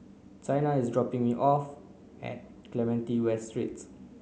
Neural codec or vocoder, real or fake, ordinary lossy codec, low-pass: none; real; none; none